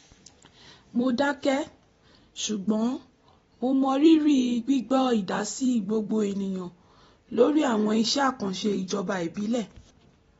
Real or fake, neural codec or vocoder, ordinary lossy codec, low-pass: fake; vocoder, 44.1 kHz, 128 mel bands every 256 samples, BigVGAN v2; AAC, 24 kbps; 19.8 kHz